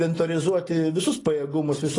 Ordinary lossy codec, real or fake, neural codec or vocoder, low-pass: AAC, 32 kbps; real; none; 10.8 kHz